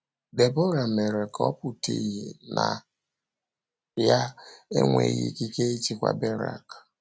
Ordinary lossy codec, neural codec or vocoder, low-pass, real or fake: none; none; none; real